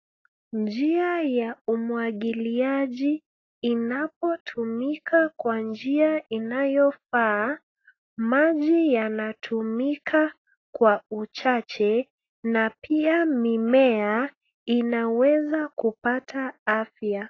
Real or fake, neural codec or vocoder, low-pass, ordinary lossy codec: real; none; 7.2 kHz; AAC, 32 kbps